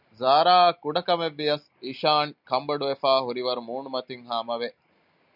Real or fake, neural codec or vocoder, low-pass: real; none; 5.4 kHz